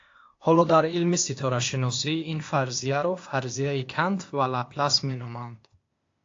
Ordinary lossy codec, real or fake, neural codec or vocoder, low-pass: AAC, 48 kbps; fake; codec, 16 kHz, 0.8 kbps, ZipCodec; 7.2 kHz